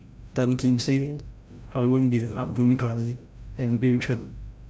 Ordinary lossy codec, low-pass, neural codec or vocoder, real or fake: none; none; codec, 16 kHz, 0.5 kbps, FreqCodec, larger model; fake